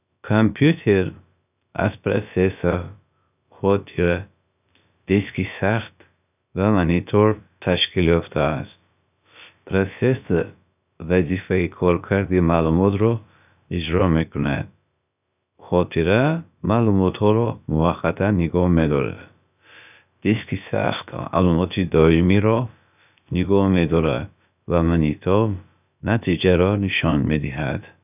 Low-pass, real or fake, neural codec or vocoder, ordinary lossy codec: 3.6 kHz; fake; codec, 16 kHz, about 1 kbps, DyCAST, with the encoder's durations; none